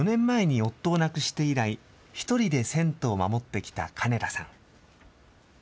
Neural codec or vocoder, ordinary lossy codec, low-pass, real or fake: none; none; none; real